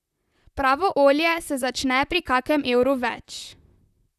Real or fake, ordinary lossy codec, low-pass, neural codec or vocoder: fake; none; 14.4 kHz; vocoder, 44.1 kHz, 128 mel bands, Pupu-Vocoder